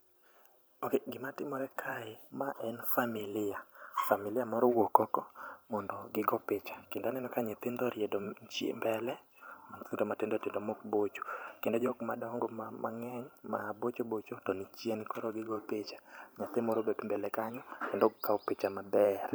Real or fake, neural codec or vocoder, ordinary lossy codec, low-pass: fake; vocoder, 44.1 kHz, 128 mel bands every 512 samples, BigVGAN v2; none; none